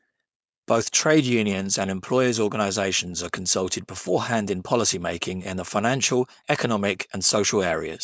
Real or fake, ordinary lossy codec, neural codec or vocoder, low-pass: fake; none; codec, 16 kHz, 4.8 kbps, FACodec; none